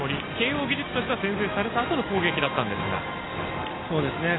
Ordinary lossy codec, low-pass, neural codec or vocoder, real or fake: AAC, 16 kbps; 7.2 kHz; none; real